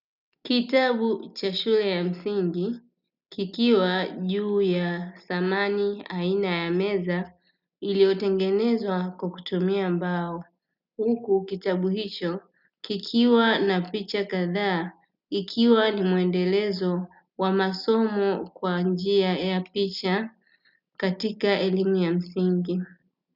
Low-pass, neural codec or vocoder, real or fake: 5.4 kHz; none; real